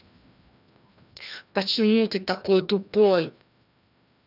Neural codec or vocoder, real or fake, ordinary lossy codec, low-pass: codec, 16 kHz, 1 kbps, FreqCodec, larger model; fake; none; 5.4 kHz